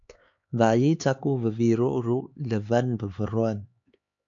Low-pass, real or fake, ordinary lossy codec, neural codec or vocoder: 7.2 kHz; fake; AAC, 64 kbps; codec, 16 kHz, 4 kbps, X-Codec, HuBERT features, trained on LibriSpeech